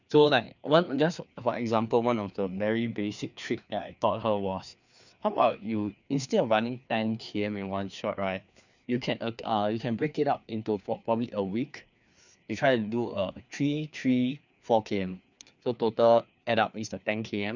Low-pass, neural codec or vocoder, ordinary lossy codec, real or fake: 7.2 kHz; codec, 16 kHz, 2 kbps, FreqCodec, larger model; none; fake